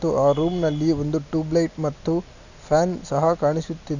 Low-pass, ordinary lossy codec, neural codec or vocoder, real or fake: 7.2 kHz; none; none; real